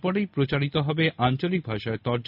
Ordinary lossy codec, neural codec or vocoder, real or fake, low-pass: none; none; real; 5.4 kHz